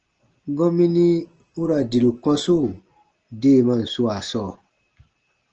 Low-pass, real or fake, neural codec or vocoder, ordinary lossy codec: 7.2 kHz; real; none; Opus, 16 kbps